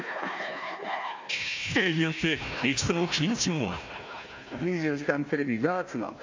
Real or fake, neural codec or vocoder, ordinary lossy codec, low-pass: fake; codec, 16 kHz, 1 kbps, FunCodec, trained on Chinese and English, 50 frames a second; MP3, 64 kbps; 7.2 kHz